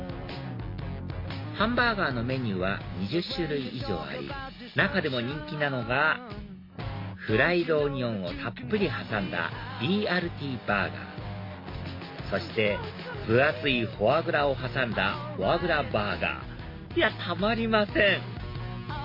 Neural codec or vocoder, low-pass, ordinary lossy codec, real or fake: none; 5.4 kHz; MP3, 32 kbps; real